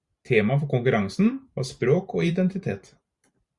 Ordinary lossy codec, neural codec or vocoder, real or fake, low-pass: Opus, 64 kbps; vocoder, 44.1 kHz, 128 mel bands every 512 samples, BigVGAN v2; fake; 10.8 kHz